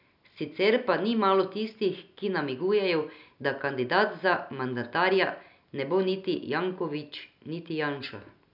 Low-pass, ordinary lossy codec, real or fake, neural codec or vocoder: 5.4 kHz; none; real; none